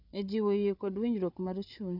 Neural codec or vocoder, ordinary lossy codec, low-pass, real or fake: codec, 16 kHz, 8 kbps, FreqCodec, larger model; none; 5.4 kHz; fake